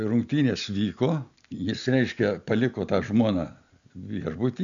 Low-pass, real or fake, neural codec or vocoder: 7.2 kHz; real; none